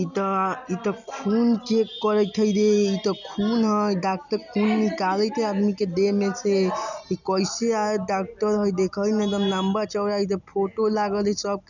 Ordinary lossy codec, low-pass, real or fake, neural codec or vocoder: none; 7.2 kHz; real; none